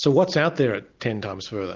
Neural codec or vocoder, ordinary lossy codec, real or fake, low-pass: none; Opus, 24 kbps; real; 7.2 kHz